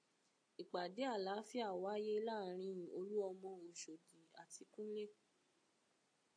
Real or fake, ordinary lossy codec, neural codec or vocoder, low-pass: real; AAC, 64 kbps; none; 9.9 kHz